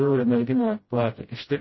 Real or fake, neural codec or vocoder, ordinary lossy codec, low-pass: fake; codec, 16 kHz, 0.5 kbps, FreqCodec, smaller model; MP3, 24 kbps; 7.2 kHz